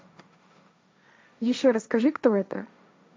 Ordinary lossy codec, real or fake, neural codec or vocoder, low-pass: none; fake; codec, 16 kHz, 1.1 kbps, Voila-Tokenizer; none